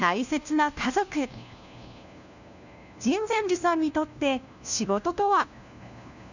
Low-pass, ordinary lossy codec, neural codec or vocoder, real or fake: 7.2 kHz; none; codec, 16 kHz, 1 kbps, FunCodec, trained on LibriTTS, 50 frames a second; fake